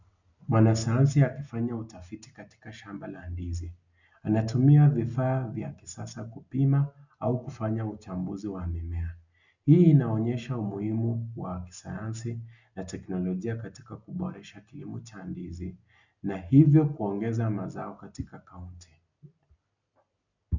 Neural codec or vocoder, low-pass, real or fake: none; 7.2 kHz; real